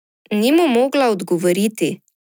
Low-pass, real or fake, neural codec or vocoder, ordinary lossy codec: 19.8 kHz; real; none; none